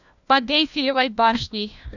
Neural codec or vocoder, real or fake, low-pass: codec, 16 kHz, 1 kbps, FunCodec, trained on LibriTTS, 50 frames a second; fake; 7.2 kHz